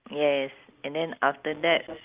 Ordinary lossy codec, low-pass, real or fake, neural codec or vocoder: Opus, 24 kbps; 3.6 kHz; real; none